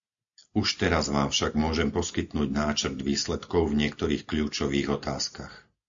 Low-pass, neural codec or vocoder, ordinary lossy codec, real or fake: 7.2 kHz; none; MP3, 64 kbps; real